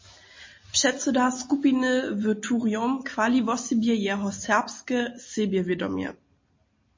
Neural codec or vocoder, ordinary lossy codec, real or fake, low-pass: none; MP3, 32 kbps; real; 7.2 kHz